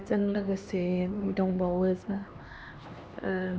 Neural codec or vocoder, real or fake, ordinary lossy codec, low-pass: codec, 16 kHz, 2 kbps, X-Codec, HuBERT features, trained on LibriSpeech; fake; none; none